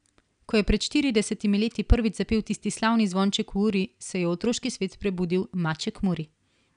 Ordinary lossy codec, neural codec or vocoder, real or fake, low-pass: none; none; real; 9.9 kHz